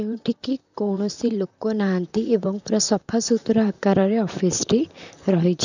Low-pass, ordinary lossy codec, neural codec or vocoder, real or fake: 7.2 kHz; none; vocoder, 44.1 kHz, 128 mel bands, Pupu-Vocoder; fake